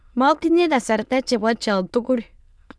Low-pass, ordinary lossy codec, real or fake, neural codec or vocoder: none; none; fake; autoencoder, 22.05 kHz, a latent of 192 numbers a frame, VITS, trained on many speakers